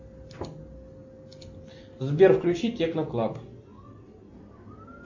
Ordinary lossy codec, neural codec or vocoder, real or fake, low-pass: AAC, 48 kbps; none; real; 7.2 kHz